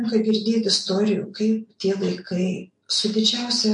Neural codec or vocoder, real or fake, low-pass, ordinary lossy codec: none; real; 10.8 kHz; MP3, 48 kbps